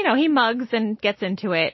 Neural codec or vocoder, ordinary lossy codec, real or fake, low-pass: none; MP3, 24 kbps; real; 7.2 kHz